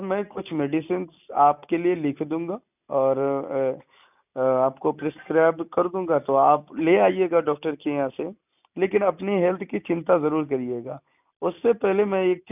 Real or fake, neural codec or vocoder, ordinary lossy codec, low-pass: real; none; none; 3.6 kHz